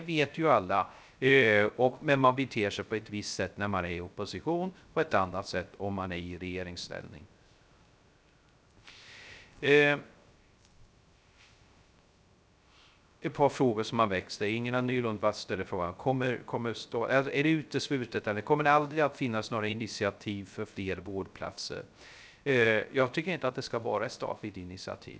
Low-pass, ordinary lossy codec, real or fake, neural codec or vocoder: none; none; fake; codec, 16 kHz, 0.3 kbps, FocalCodec